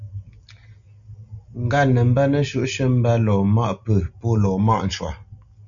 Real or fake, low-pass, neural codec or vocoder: real; 7.2 kHz; none